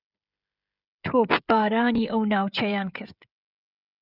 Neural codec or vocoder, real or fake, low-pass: codec, 16 kHz, 16 kbps, FreqCodec, smaller model; fake; 5.4 kHz